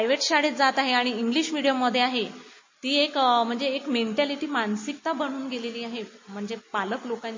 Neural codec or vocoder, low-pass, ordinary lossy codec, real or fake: none; 7.2 kHz; MP3, 32 kbps; real